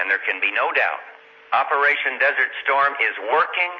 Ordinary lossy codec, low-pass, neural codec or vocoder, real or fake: MP3, 32 kbps; 7.2 kHz; none; real